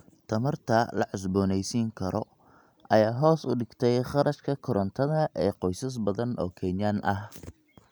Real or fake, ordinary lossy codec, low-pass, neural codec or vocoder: real; none; none; none